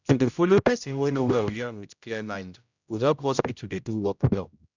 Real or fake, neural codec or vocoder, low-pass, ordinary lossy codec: fake; codec, 16 kHz, 0.5 kbps, X-Codec, HuBERT features, trained on general audio; 7.2 kHz; none